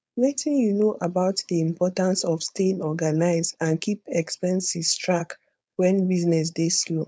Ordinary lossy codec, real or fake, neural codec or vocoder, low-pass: none; fake; codec, 16 kHz, 4.8 kbps, FACodec; none